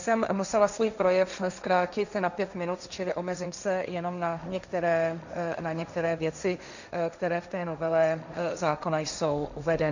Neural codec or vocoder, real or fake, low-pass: codec, 16 kHz, 1.1 kbps, Voila-Tokenizer; fake; 7.2 kHz